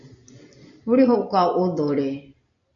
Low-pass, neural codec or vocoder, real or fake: 7.2 kHz; none; real